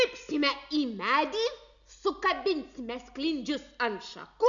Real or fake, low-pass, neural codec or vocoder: real; 7.2 kHz; none